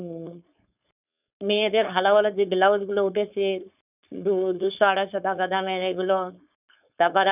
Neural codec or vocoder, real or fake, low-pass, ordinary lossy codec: codec, 16 kHz, 4.8 kbps, FACodec; fake; 3.6 kHz; none